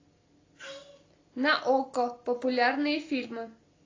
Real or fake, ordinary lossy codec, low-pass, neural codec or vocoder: real; AAC, 32 kbps; 7.2 kHz; none